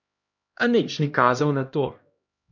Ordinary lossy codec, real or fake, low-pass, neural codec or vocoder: none; fake; 7.2 kHz; codec, 16 kHz, 1 kbps, X-Codec, HuBERT features, trained on LibriSpeech